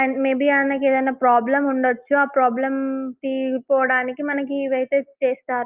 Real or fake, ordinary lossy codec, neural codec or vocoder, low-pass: real; Opus, 32 kbps; none; 3.6 kHz